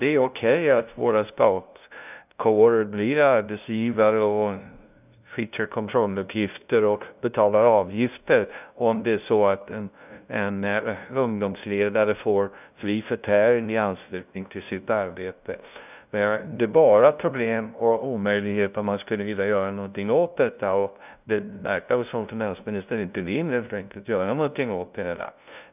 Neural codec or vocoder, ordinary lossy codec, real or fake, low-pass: codec, 16 kHz, 0.5 kbps, FunCodec, trained on LibriTTS, 25 frames a second; none; fake; 3.6 kHz